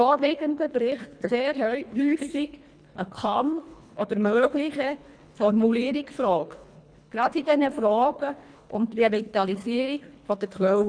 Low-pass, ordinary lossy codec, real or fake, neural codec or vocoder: 9.9 kHz; none; fake; codec, 24 kHz, 1.5 kbps, HILCodec